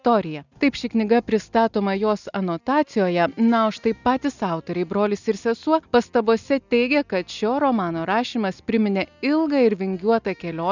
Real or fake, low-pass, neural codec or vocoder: real; 7.2 kHz; none